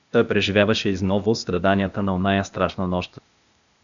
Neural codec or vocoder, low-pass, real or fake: codec, 16 kHz, 0.8 kbps, ZipCodec; 7.2 kHz; fake